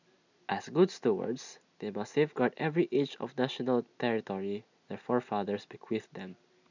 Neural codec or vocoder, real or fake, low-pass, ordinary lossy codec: none; real; 7.2 kHz; none